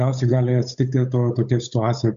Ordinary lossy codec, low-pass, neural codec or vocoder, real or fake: MP3, 64 kbps; 7.2 kHz; codec, 16 kHz, 8 kbps, FunCodec, trained on Chinese and English, 25 frames a second; fake